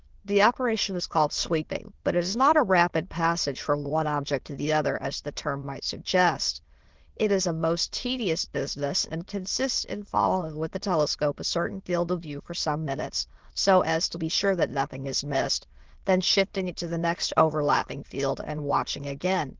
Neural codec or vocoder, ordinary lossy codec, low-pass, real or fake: autoencoder, 22.05 kHz, a latent of 192 numbers a frame, VITS, trained on many speakers; Opus, 16 kbps; 7.2 kHz; fake